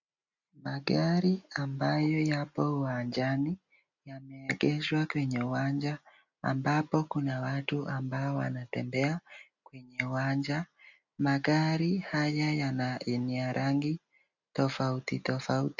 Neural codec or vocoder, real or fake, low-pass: none; real; 7.2 kHz